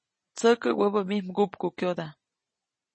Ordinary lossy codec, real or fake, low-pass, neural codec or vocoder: MP3, 32 kbps; real; 9.9 kHz; none